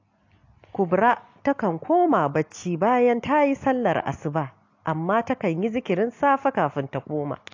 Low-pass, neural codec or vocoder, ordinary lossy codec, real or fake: 7.2 kHz; none; AAC, 48 kbps; real